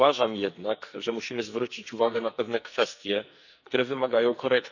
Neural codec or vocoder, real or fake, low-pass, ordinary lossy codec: codec, 44.1 kHz, 2.6 kbps, SNAC; fake; 7.2 kHz; none